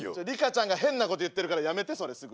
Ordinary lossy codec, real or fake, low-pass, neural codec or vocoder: none; real; none; none